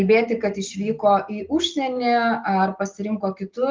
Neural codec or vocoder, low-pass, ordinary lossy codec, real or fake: none; 7.2 kHz; Opus, 32 kbps; real